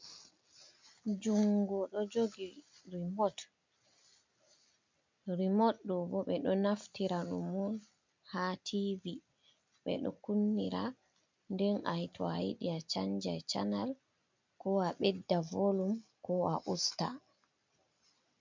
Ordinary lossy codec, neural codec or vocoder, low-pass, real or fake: MP3, 64 kbps; none; 7.2 kHz; real